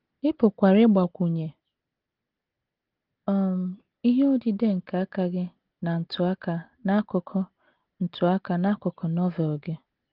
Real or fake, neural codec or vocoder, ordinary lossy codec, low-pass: real; none; Opus, 16 kbps; 5.4 kHz